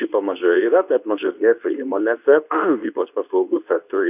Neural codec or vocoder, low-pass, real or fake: codec, 24 kHz, 0.9 kbps, WavTokenizer, medium speech release version 2; 3.6 kHz; fake